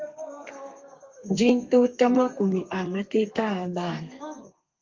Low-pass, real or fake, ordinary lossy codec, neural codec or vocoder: 7.2 kHz; fake; Opus, 32 kbps; codec, 32 kHz, 1.9 kbps, SNAC